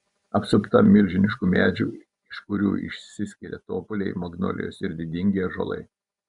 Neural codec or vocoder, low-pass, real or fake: vocoder, 24 kHz, 100 mel bands, Vocos; 10.8 kHz; fake